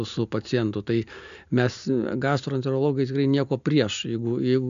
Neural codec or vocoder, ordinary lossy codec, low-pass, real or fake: none; MP3, 64 kbps; 7.2 kHz; real